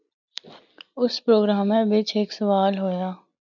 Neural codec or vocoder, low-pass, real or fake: none; 7.2 kHz; real